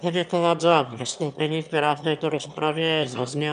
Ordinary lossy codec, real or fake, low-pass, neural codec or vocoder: AAC, 96 kbps; fake; 9.9 kHz; autoencoder, 22.05 kHz, a latent of 192 numbers a frame, VITS, trained on one speaker